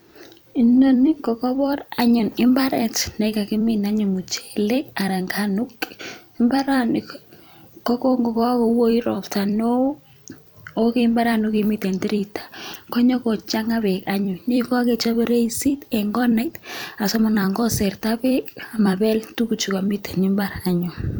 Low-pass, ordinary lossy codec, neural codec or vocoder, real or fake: none; none; vocoder, 44.1 kHz, 128 mel bands every 256 samples, BigVGAN v2; fake